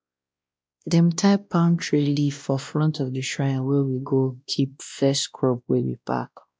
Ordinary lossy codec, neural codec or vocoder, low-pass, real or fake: none; codec, 16 kHz, 1 kbps, X-Codec, WavLM features, trained on Multilingual LibriSpeech; none; fake